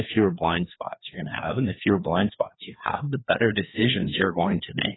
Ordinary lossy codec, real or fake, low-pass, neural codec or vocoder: AAC, 16 kbps; fake; 7.2 kHz; codec, 16 kHz, 2 kbps, FreqCodec, larger model